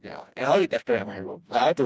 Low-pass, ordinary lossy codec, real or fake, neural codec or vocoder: none; none; fake; codec, 16 kHz, 1 kbps, FreqCodec, smaller model